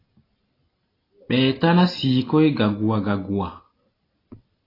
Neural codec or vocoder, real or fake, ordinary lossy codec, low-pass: none; real; AAC, 24 kbps; 5.4 kHz